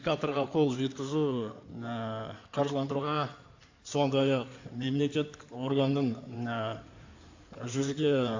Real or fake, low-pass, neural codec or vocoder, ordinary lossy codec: fake; 7.2 kHz; codec, 16 kHz in and 24 kHz out, 2.2 kbps, FireRedTTS-2 codec; AAC, 48 kbps